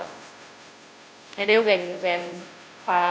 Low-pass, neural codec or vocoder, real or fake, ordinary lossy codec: none; codec, 16 kHz, 0.5 kbps, FunCodec, trained on Chinese and English, 25 frames a second; fake; none